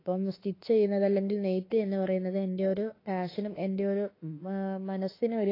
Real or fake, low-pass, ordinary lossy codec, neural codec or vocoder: fake; 5.4 kHz; AAC, 24 kbps; autoencoder, 48 kHz, 32 numbers a frame, DAC-VAE, trained on Japanese speech